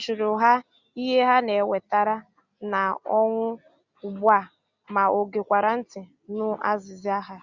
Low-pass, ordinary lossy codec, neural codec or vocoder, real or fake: 7.2 kHz; Opus, 64 kbps; none; real